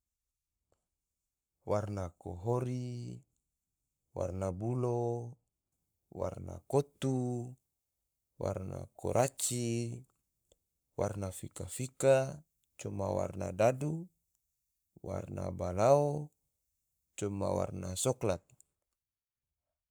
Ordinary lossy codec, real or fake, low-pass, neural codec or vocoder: none; fake; none; codec, 44.1 kHz, 7.8 kbps, Pupu-Codec